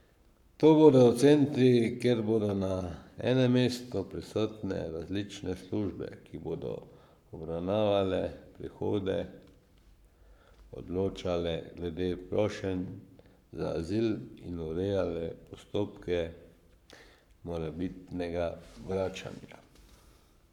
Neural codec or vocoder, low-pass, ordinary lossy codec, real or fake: codec, 44.1 kHz, 7.8 kbps, Pupu-Codec; 19.8 kHz; none; fake